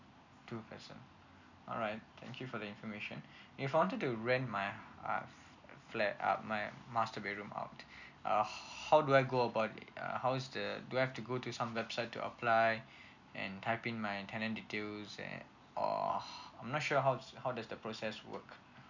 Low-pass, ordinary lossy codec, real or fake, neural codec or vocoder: 7.2 kHz; none; real; none